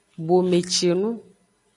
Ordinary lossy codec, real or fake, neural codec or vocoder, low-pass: MP3, 96 kbps; real; none; 10.8 kHz